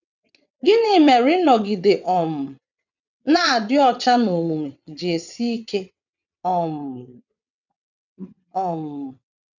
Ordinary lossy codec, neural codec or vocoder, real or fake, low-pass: none; vocoder, 22.05 kHz, 80 mel bands, WaveNeXt; fake; 7.2 kHz